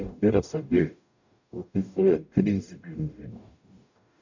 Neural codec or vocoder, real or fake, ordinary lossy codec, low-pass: codec, 44.1 kHz, 0.9 kbps, DAC; fake; none; 7.2 kHz